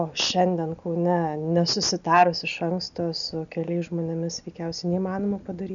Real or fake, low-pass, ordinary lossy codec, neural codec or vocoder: real; 7.2 kHz; MP3, 96 kbps; none